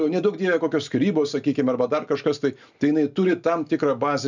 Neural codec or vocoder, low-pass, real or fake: none; 7.2 kHz; real